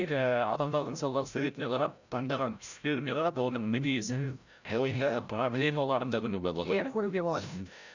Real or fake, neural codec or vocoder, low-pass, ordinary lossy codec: fake; codec, 16 kHz, 0.5 kbps, FreqCodec, larger model; 7.2 kHz; none